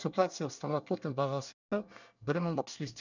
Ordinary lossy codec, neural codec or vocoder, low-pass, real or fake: none; codec, 24 kHz, 1 kbps, SNAC; 7.2 kHz; fake